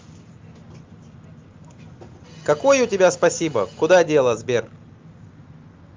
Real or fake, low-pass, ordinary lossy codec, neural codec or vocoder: real; 7.2 kHz; Opus, 32 kbps; none